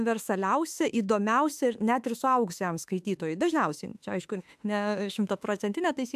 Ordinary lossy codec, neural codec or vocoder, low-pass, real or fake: AAC, 96 kbps; autoencoder, 48 kHz, 32 numbers a frame, DAC-VAE, trained on Japanese speech; 14.4 kHz; fake